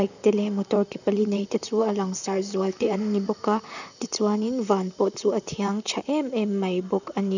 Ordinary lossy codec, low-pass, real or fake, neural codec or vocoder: none; 7.2 kHz; fake; vocoder, 44.1 kHz, 128 mel bands, Pupu-Vocoder